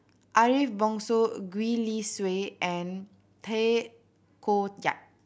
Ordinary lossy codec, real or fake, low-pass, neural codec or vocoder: none; real; none; none